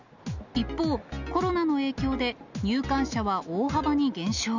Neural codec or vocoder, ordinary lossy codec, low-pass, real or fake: none; none; 7.2 kHz; real